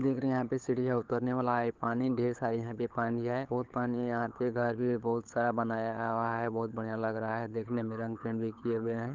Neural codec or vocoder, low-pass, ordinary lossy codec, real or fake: codec, 16 kHz, 8 kbps, FunCodec, trained on LibriTTS, 25 frames a second; 7.2 kHz; Opus, 16 kbps; fake